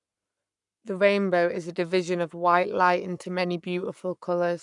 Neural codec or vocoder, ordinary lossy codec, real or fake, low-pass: codec, 44.1 kHz, 7.8 kbps, Pupu-Codec; none; fake; 10.8 kHz